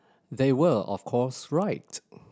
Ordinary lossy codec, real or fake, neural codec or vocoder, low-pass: none; real; none; none